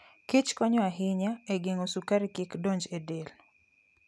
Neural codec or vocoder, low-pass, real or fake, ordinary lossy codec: none; none; real; none